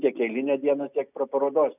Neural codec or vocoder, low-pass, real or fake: none; 3.6 kHz; real